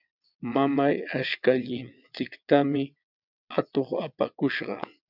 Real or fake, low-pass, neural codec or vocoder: fake; 5.4 kHz; vocoder, 22.05 kHz, 80 mel bands, WaveNeXt